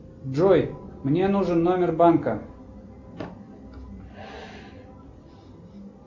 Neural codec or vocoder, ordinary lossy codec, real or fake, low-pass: none; MP3, 64 kbps; real; 7.2 kHz